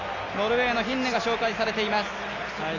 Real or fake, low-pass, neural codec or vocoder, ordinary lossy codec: real; 7.2 kHz; none; none